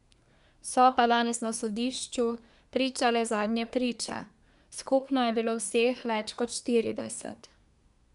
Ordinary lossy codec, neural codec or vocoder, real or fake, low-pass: none; codec, 24 kHz, 1 kbps, SNAC; fake; 10.8 kHz